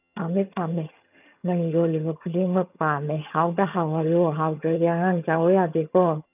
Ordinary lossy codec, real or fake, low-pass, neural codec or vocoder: none; fake; 3.6 kHz; vocoder, 22.05 kHz, 80 mel bands, HiFi-GAN